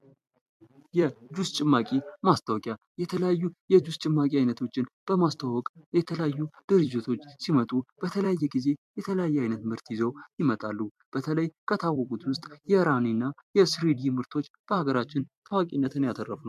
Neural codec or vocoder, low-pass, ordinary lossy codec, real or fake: vocoder, 44.1 kHz, 128 mel bands every 256 samples, BigVGAN v2; 14.4 kHz; MP3, 96 kbps; fake